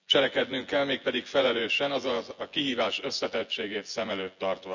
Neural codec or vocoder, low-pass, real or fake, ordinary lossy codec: vocoder, 24 kHz, 100 mel bands, Vocos; 7.2 kHz; fake; none